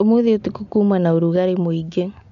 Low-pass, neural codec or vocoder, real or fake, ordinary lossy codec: 7.2 kHz; none; real; MP3, 64 kbps